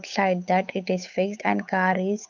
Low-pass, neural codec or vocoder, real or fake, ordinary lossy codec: 7.2 kHz; codec, 16 kHz, 8 kbps, FunCodec, trained on Chinese and English, 25 frames a second; fake; MP3, 64 kbps